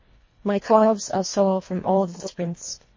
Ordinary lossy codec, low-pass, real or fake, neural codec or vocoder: MP3, 32 kbps; 7.2 kHz; fake; codec, 24 kHz, 1.5 kbps, HILCodec